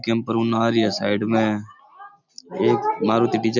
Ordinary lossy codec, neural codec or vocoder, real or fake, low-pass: none; none; real; 7.2 kHz